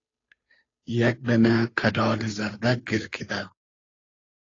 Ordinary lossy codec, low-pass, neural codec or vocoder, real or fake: AAC, 32 kbps; 7.2 kHz; codec, 16 kHz, 2 kbps, FunCodec, trained on Chinese and English, 25 frames a second; fake